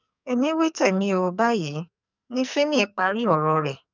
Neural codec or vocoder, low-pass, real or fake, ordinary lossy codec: codec, 44.1 kHz, 2.6 kbps, SNAC; 7.2 kHz; fake; none